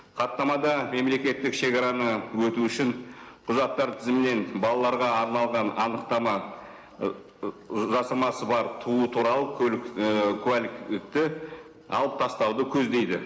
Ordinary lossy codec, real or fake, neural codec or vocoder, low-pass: none; real; none; none